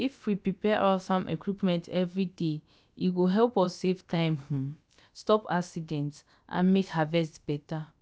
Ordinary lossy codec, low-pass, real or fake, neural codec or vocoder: none; none; fake; codec, 16 kHz, about 1 kbps, DyCAST, with the encoder's durations